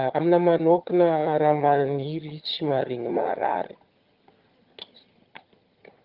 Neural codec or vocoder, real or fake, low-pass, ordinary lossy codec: vocoder, 22.05 kHz, 80 mel bands, HiFi-GAN; fake; 5.4 kHz; Opus, 32 kbps